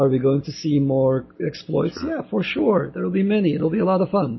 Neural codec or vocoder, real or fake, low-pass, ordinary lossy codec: none; real; 7.2 kHz; MP3, 24 kbps